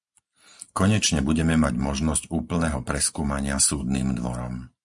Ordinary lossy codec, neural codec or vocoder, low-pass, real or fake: Opus, 64 kbps; none; 10.8 kHz; real